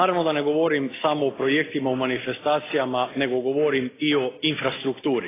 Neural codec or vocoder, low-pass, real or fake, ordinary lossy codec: none; 3.6 kHz; real; AAC, 16 kbps